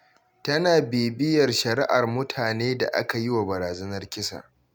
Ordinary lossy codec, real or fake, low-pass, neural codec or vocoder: none; real; none; none